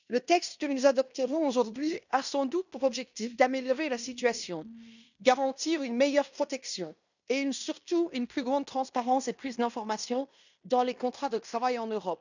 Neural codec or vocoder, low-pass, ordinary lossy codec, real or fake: codec, 16 kHz in and 24 kHz out, 0.9 kbps, LongCat-Audio-Codec, fine tuned four codebook decoder; 7.2 kHz; none; fake